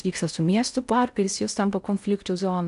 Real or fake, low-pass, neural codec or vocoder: fake; 10.8 kHz; codec, 16 kHz in and 24 kHz out, 0.6 kbps, FocalCodec, streaming, 4096 codes